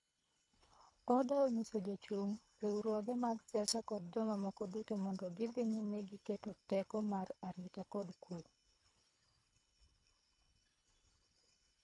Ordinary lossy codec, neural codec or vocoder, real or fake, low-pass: none; codec, 24 kHz, 3 kbps, HILCodec; fake; 10.8 kHz